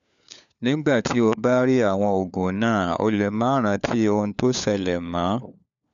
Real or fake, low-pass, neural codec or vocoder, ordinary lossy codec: fake; 7.2 kHz; codec, 16 kHz, 4 kbps, FunCodec, trained on LibriTTS, 50 frames a second; none